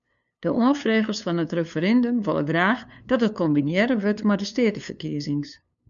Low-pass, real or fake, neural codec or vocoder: 7.2 kHz; fake; codec, 16 kHz, 2 kbps, FunCodec, trained on LibriTTS, 25 frames a second